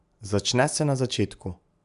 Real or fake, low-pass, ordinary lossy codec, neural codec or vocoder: real; 10.8 kHz; none; none